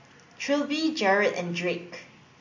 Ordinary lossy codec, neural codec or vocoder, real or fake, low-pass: MP3, 48 kbps; none; real; 7.2 kHz